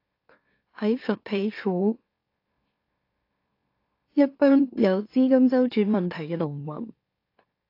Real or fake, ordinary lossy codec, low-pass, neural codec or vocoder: fake; AAC, 32 kbps; 5.4 kHz; autoencoder, 44.1 kHz, a latent of 192 numbers a frame, MeloTTS